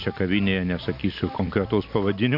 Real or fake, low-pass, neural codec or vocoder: fake; 5.4 kHz; vocoder, 44.1 kHz, 128 mel bands every 256 samples, BigVGAN v2